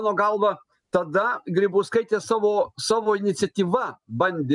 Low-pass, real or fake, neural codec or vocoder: 10.8 kHz; real; none